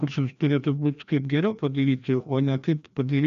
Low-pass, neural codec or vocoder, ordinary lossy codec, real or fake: 7.2 kHz; codec, 16 kHz, 1 kbps, FreqCodec, larger model; AAC, 96 kbps; fake